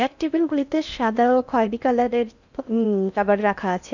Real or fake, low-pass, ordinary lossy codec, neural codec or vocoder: fake; 7.2 kHz; none; codec, 16 kHz in and 24 kHz out, 0.6 kbps, FocalCodec, streaming, 4096 codes